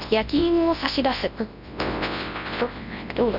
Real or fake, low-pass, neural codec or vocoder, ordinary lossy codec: fake; 5.4 kHz; codec, 24 kHz, 0.9 kbps, WavTokenizer, large speech release; none